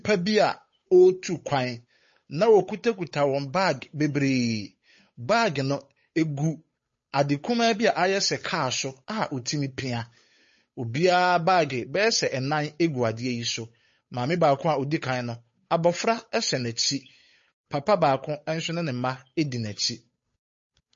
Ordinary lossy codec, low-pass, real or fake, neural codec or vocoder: MP3, 32 kbps; 7.2 kHz; fake; codec, 16 kHz, 8 kbps, FunCodec, trained on Chinese and English, 25 frames a second